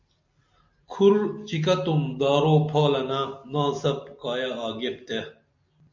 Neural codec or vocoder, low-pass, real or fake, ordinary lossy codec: none; 7.2 kHz; real; MP3, 64 kbps